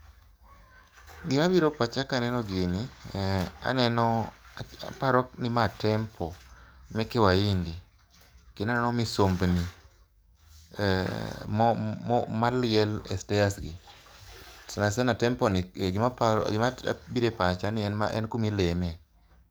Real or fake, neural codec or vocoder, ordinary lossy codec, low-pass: fake; codec, 44.1 kHz, 7.8 kbps, DAC; none; none